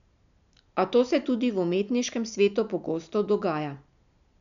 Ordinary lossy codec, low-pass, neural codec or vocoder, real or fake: none; 7.2 kHz; none; real